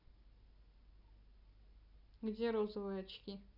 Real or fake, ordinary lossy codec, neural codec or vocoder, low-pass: real; none; none; 5.4 kHz